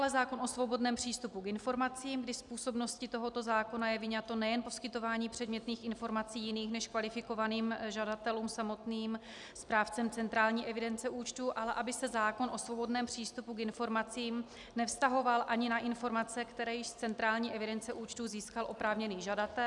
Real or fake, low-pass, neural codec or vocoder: real; 10.8 kHz; none